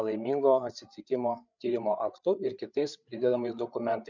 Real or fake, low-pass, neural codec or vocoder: fake; 7.2 kHz; codec, 16 kHz, 8 kbps, FreqCodec, larger model